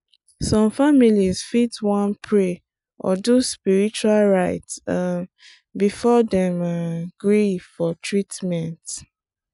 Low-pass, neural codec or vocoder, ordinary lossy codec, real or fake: 10.8 kHz; none; none; real